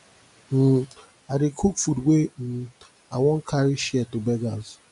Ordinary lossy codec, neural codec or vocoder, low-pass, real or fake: none; none; 10.8 kHz; real